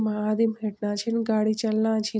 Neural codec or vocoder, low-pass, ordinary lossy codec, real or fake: none; none; none; real